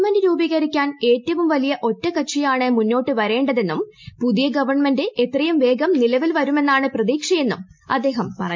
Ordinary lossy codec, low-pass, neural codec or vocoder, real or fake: MP3, 48 kbps; 7.2 kHz; none; real